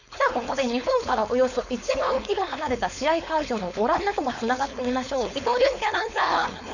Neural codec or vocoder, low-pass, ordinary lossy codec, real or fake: codec, 16 kHz, 4.8 kbps, FACodec; 7.2 kHz; none; fake